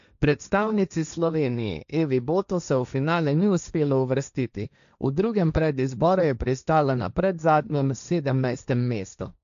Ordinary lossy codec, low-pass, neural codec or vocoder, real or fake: MP3, 96 kbps; 7.2 kHz; codec, 16 kHz, 1.1 kbps, Voila-Tokenizer; fake